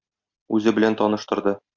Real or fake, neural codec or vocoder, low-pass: real; none; 7.2 kHz